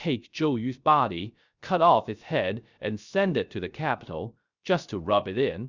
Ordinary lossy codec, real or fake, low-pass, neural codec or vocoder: Opus, 64 kbps; fake; 7.2 kHz; codec, 16 kHz, 0.3 kbps, FocalCodec